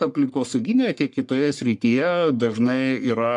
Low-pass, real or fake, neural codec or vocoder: 10.8 kHz; fake; codec, 44.1 kHz, 3.4 kbps, Pupu-Codec